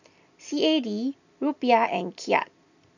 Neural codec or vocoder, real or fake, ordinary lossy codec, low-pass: none; real; none; 7.2 kHz